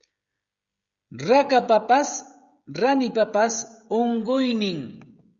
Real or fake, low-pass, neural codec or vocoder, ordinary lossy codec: fake; 7.2 kHz; codec, 16 kHz, 16 kbps, FreqCodec, smaller model; Opus, 64 kbps